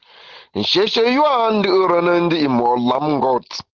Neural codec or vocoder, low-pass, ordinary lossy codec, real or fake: none; 7.2 kHz; Opus, 16 kbps; real